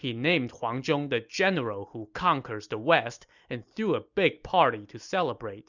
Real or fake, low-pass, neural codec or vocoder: real; 7.2 kHz; none